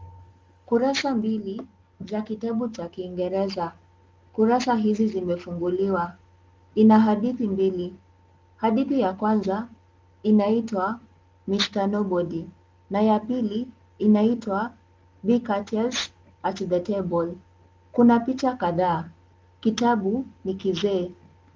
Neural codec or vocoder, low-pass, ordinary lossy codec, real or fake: none; 7.2 kHz; Opus, 32 kbps; real